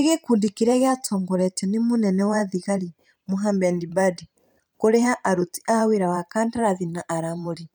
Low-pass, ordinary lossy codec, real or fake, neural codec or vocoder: 19.8 kHz; none; fake; vocoder, 44.1 kHz, 128 mel bands every 512 samples, BigVGAN v2